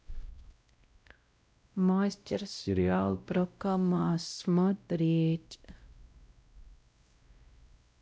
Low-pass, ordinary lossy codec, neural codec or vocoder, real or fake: none; none; codec, 16 kHz, 0.5 kbps, X-Codec, WavLM features, trained on Multilingual LibriSpeech; fake